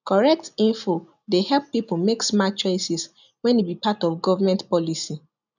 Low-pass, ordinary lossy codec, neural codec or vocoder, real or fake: 7.2 kHz; none; none; real